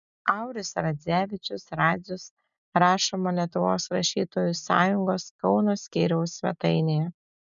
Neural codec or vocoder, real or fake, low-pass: none; real; 7.2 kHz